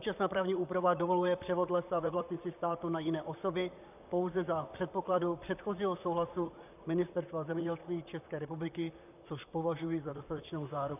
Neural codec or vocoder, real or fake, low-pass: vocoder, 44.1 kHz, 128 mel bands, Pupu-Vocoder; fake; 3.6 kHz